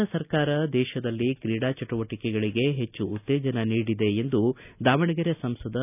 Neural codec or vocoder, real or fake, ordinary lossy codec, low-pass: none; real; none; 3.6 kHz